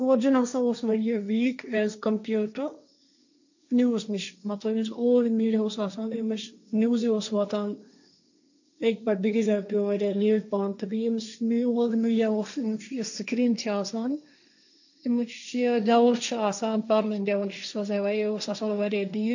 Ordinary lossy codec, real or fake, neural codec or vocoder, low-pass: none; fake; codec, 16 kHz, 1.1 kbps, Voila-Tokenizer; 7.2 kHz